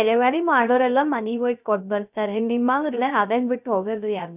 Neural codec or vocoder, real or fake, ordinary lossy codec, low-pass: codec, 16 kHz, 0.3 kbps, FocalCodec; fake; none; 3.6 kHz